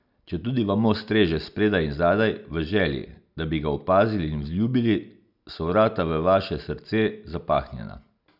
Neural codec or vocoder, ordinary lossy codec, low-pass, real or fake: none; none; 5.4 kHz; real